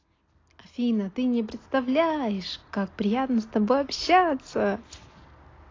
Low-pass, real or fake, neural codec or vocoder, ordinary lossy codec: 7.2 kHz; real; none; AAC, 32 kbps